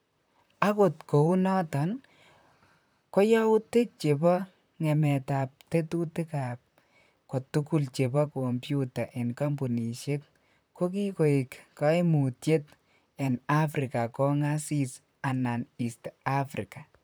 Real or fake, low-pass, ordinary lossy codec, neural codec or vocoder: fake; none; none; vocoder, 44.1 kHz, 128 mel bands, Pupu-Vocoder